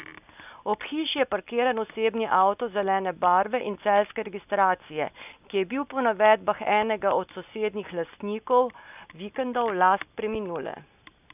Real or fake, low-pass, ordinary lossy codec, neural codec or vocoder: real; 3.6 kHz; none; none